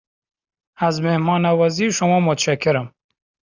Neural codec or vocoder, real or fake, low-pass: none; real; 7.2 kHz